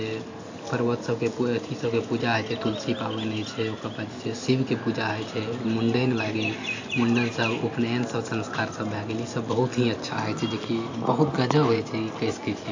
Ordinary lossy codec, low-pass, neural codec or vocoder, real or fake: AAC, 48 kbps; 7.2 kHz; none; real